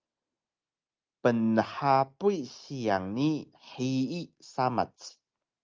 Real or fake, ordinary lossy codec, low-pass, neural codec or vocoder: real; Opus, 24 kbps; 7.2 kHz; none